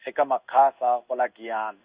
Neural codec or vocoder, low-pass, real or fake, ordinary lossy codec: codec, 16 kHz in and 24 kHz out, 1 kbps, XY-Tokenizer; 3.6 kHz; fake; Opus, 64 kbps